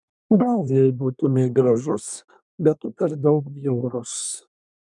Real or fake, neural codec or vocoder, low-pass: fake; codec, 24 kHz, 1 kbps, SNAC; 10.8 kHz